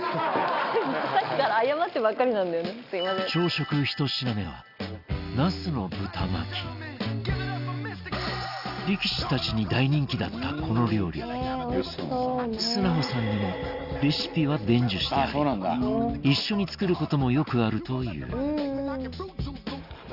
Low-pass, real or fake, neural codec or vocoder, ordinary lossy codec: 5.4 kHz; real; none; Opus, 64 kbps